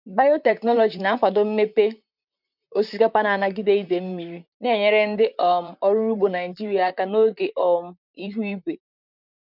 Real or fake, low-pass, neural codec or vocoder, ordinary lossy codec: fake; 5.4 kHz; vocoder, 44.1 kHz, 128 mel bands, Pupu-Vocoder; none